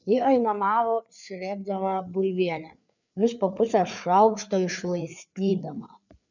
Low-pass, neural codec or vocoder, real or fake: 7.2 kHz; codec, 16 kHz, 4 kbps, FreqCodec, larger model; fake